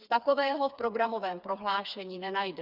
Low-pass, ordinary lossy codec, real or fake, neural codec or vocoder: 5.4 kHz; Opus, 64 kbps; fake; codec, 16 kHz, 4 kbps, FreqCodec, smaller model